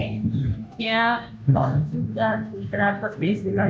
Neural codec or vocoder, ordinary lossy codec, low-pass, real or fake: codec, 16 kHz, 0.5 kbps, FunCodec, trained on Chinese and English, 25 frames a second; none; none; fake